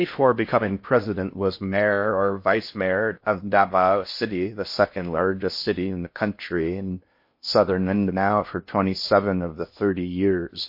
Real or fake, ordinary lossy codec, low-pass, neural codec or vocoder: fake; MP3, 32 kbps; 5.4 kHz; codec, 16 kHz in and 24 kHz out, 0.6 kbps, FocalCodec, streaming, 2048 codes